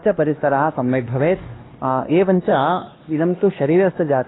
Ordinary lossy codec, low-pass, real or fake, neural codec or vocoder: AAC, 16 kbps; 7.2 kHz; fake; codec, 16 kHz, 2 kbps, X-Codec, HuBERT features, trained on LibriSpeech